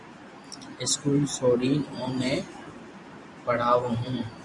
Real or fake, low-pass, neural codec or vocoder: fake; 10.8 kHz; vocoder, 44.1 kHz, 128 mel bands every 512 samples, BigVGAN v2